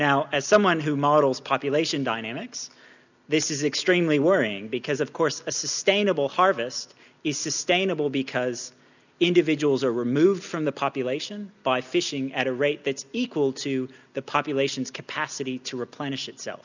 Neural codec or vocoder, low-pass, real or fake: none; 7.2 kHz; real